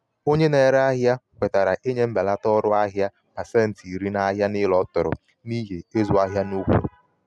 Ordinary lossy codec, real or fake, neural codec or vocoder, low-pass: none; real; none; none